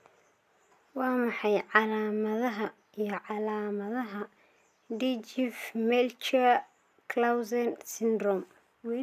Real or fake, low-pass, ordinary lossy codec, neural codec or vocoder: real; 14.4 kHz; none; none